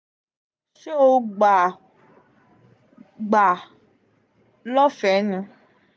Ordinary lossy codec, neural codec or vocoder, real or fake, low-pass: none; none; real; none